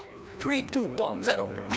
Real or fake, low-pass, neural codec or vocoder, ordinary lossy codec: fake; none; codec, 16 kHz, 1 kbps, FreqCodec, larger model; none